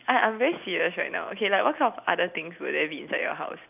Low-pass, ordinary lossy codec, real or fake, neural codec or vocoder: 3.6 kHz; none; real; none